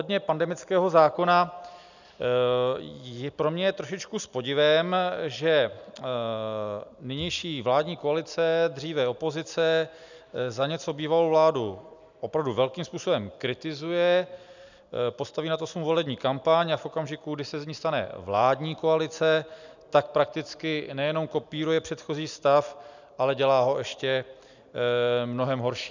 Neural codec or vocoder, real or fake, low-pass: none; real; 7.2 kHz